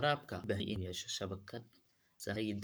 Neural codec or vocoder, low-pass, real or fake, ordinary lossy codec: codec, 44.1 kHz, 7.8 kbps, Pupu-Codec; none; fake; none